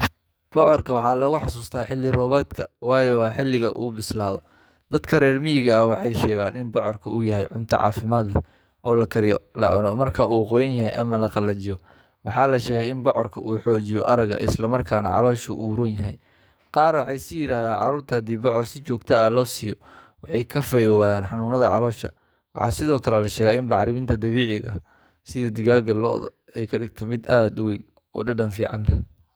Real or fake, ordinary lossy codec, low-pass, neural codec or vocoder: fake; none; none; codec, 44.1 kHz, 2.6 kbps, SNAC